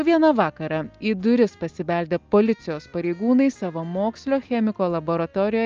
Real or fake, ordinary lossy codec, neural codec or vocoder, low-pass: real; Opus, 24 kbps; none; 7.2 kHz